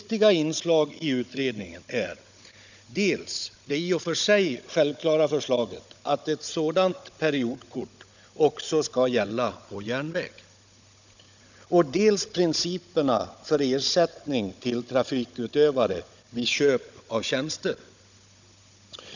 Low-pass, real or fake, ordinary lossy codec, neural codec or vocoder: 7.2 kHz; fake; none; codec, 16 kHz, 16 kbps, FunCodec, trained on Chinese and English, 50 frames a second